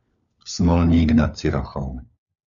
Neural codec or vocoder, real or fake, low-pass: codec, 16 kHz, 4 kbps, FunCodec, trained on LibriTTS, 50 frames a second; fake; 7.2 kHz